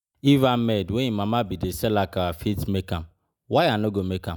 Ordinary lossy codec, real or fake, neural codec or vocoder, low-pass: none; real; none; none